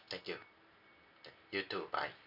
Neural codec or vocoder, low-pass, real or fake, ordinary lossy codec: none; 5.4 kHz; real; none